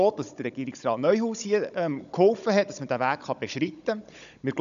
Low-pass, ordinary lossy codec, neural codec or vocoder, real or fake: 7.2 kHz; none; codec, 16 kHz, 16 kbps, FunCodec, trained on Chinese and English, 50 frames a second; fake